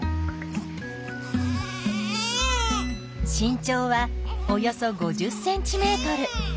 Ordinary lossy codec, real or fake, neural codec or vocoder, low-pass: none; real; none; none